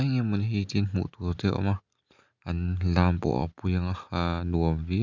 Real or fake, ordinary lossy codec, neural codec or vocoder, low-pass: real; none; none; 7.2 kHz